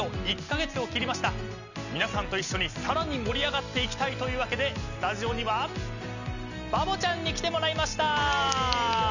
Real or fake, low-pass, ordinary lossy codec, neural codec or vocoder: real; 7.2 kHz; none; none